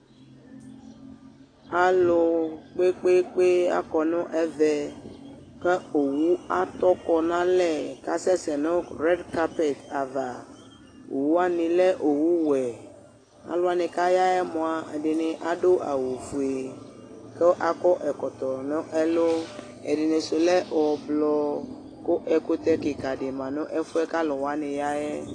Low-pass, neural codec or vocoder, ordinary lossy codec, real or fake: 9.9 kHz; none; AAC, 32 kbps; real